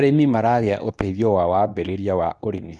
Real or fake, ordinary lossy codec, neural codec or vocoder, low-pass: fake; none; codec, 24 kHz, 0.9 kbps, WavTokenizer, medium speech release version 2; none